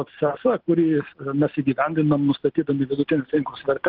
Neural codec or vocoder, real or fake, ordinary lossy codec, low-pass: none; real; Opus, 16 kbps; 5.4 kHz